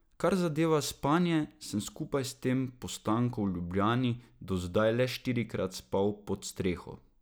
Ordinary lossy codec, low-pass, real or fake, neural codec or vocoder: none; none; real; none